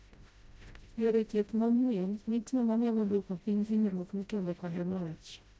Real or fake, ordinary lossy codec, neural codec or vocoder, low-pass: fake; none; codec, 16 kHz, 0.5 kbps, FreqCodec, smaller model; none